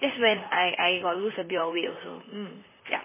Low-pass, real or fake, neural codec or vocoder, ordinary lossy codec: 3.6 kHz; fake; vocoder, 44.1 kHz, 128 mel bands, Pupu-Vocoder; MP3, 16 kbps